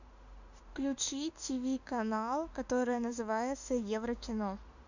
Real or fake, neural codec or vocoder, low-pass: fake; autoencoder, 48 kHz, 32 numbers a frame, DAC-VAE, trained on Japanese speech; 7.2 kHz